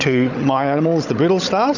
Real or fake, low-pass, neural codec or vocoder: fake; 7.2 kHz; codec, 16 kHz, 16 kbps, FunCodec, trained on Chinese and English, 50 frames a second